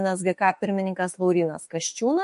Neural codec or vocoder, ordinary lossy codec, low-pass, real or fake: autoencoder, 48 kHz, 32 numbers a frame, DAC-VAE, trained on Japanese speech; MP3, 48 kbps; 14.4 kHz; fake